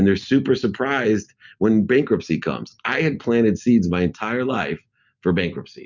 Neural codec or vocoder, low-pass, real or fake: none; 7.2 kHz; real